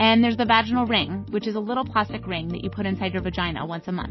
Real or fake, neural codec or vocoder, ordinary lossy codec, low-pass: real; none; MP3, 24 kbps; 7.2 kHz